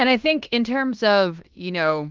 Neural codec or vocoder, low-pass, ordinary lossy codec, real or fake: codec, 16 kHz in and 24 kHz out, 0.9 kbps, LongCat-Audio-Codec, fine tuned four codebook decoder; 7.2 kHz; Opus, 32 kbps; fake